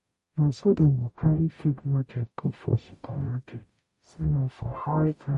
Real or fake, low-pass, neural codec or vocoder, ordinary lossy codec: fake; 14.4 kHz; codec, 44.1 kHz, 0.9 kbps, DAC; MP3, 48 kbps